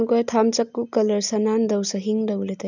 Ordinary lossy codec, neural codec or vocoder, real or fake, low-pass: none; none; real; 7.2 kHz